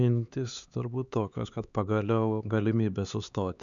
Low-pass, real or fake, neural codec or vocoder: 7.2 kHz; fake; codec, 16 kHz, 4 kbps, X-Codec, HuBERT features, trained on LibriSpeech